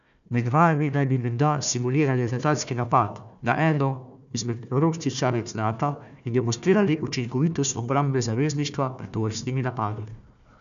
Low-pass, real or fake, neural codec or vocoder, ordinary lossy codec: 7.2 kHz; fake; codec, 16 kHz, 1 kbps, FunCodec, trained on Chinese and English, 50 frames a second; none